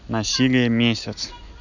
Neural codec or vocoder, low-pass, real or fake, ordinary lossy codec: none; 7.2 kHz; real; none